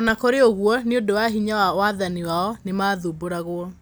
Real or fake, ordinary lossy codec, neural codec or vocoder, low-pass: real; none; none; none